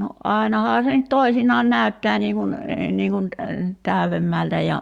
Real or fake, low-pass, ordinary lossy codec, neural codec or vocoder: fake; 19.8 kHz; Opus, 64 kbps; codec, 44.1 kHz, 7.8 kbps, Pupu-Codec